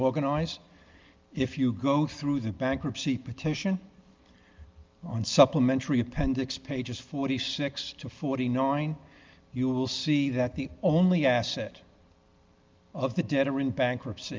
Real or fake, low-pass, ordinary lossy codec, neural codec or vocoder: real; 7.2 kHz; Opus, 32 kbps; none